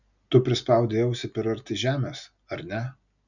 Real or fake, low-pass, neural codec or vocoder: real; 7.2 kHz; none